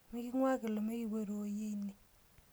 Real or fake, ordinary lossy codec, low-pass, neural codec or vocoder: real; none; none; none